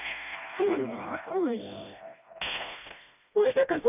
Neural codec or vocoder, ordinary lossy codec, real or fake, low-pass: codec, 16 kHz, 1 kbps, FreqCodec, smaller model; none; fake; 3.6 kHz